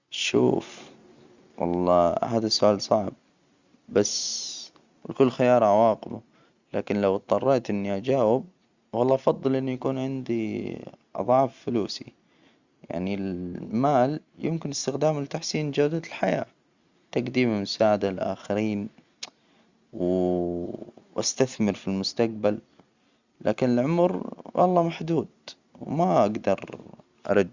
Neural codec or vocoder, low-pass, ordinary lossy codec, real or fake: none; 7.2 kHz; Opus, 64 kbps; real